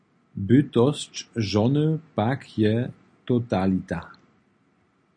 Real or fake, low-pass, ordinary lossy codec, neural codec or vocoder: real; 9.9 kHz; MP3, 48 kbps; none